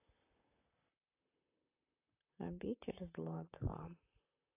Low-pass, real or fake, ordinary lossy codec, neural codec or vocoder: 3.6 kHz; fake; AAC, 24 kbps; vocoder, 44.1 kHz, 128 mel bands, Pupu-Vocoder